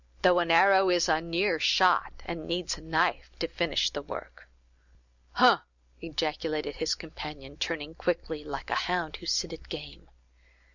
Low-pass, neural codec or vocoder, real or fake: 7.2 kHz; none; real